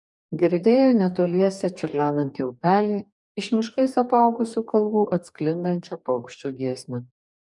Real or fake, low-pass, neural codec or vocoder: fake; 10.8 kHz; codec, 44.1 kHz, 2.6 kbps, DAC